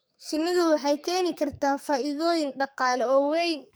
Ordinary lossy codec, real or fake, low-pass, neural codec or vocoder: none; fake; none; codec, 44.1 kHz, 2.6 kbps, SNAC